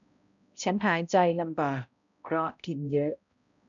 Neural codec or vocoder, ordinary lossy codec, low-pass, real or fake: codec, 16 kHz, 0.5 kbps, X-Codec, HuBERT features, trained on balanced general audio; none; 7.2 kHz; fake